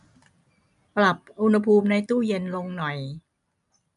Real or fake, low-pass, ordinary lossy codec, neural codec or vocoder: real; 10.8 kHz; none; none